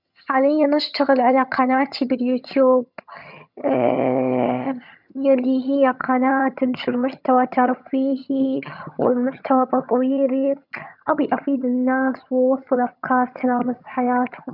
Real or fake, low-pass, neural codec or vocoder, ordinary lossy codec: fake; 5.4 kHz; vocoder, 22.05 kHz, 80 mel bands, HiFi-GAN; none